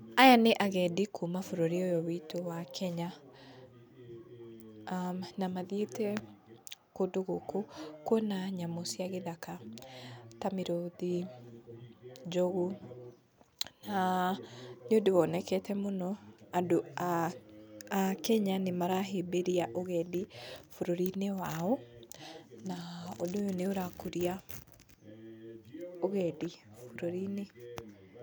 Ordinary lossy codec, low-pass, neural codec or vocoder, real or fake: none; none; none; real